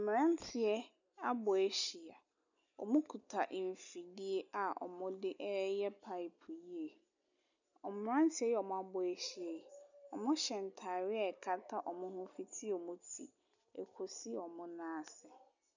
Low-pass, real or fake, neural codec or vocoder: 7.2 kHz; real; none